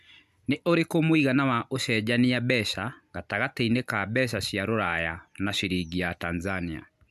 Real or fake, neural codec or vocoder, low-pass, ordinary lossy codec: real; none; 14.4 kHz; none